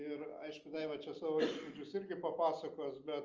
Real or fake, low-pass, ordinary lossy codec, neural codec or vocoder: real; 7.2 kHz; Opus, 24 kbps; none